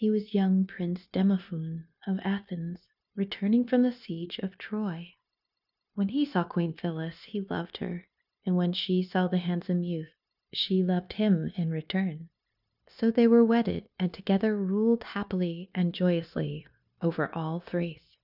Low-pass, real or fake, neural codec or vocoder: 5.4 kHz; fake; codec, 16 kHz, 0.9 kbps, LongCat-Audio-Codec